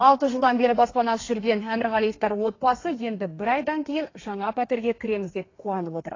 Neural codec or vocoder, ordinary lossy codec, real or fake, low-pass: codec, 32 kHz, 1.9 kbps, SNAC; AAC, 32 kbps; fake; 7.2 kHz